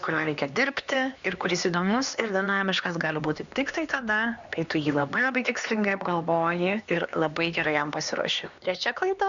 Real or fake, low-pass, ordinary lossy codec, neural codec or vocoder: fake; 7.2 kHz; Opus, 64 kbps; codec, 16 kHz, 2 kbps, X-Codec, HuBERT features, trained on LibriSpeech